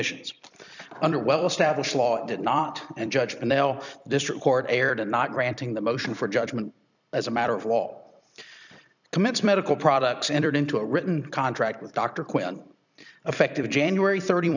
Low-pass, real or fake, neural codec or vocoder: 7.2 kHz; fake; vocoder, 44.1 kHz, 128 mel bands every 256 samples, BigVGAN v2